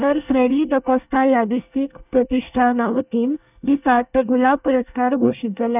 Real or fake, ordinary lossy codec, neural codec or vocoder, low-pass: fake; none; codec, 24 kHz, 1 kbps, SNAC; 3.6 kHz